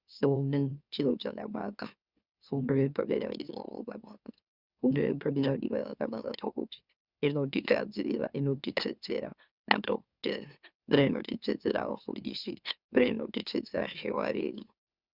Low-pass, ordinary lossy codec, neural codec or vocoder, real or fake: 5.4 kHz; Opus, 64 kbps; autoencoder, 44.1 kHz, a latent of 192 numbers a frame, MeloTTS; fake